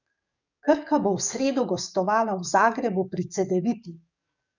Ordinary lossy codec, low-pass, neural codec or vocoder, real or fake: none; 7.2 kHz; codec, 44.1 kHz, 7.8 kbps, DAC; fake